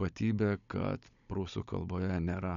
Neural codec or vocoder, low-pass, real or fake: codec, 16 kHz, 16 kbps, FunCodec, trained on Chinese and English, 50 frames a second; 7.2 kHz; fake